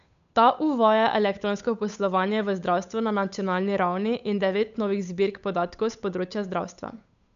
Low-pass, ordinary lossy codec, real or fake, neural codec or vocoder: 7.2 kHz; none; fake; codec, 16 kHz, 8 kbps, FunCodec, trained on Chinese and English, 25 frames a second